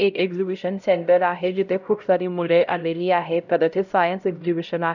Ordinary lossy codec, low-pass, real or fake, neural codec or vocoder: none; 7.2 kHz; fake; codec, 16 kHz, 0.5 kbps, X-Codec, HuBERT features, trained on LibriSpeech